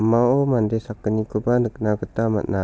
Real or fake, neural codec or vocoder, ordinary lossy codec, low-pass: real; none; none; none